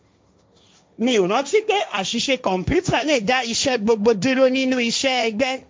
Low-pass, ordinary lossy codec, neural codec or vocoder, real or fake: none; none; codec, 16 kHz, 1.1 kbps, Voila-Tokenizer; fake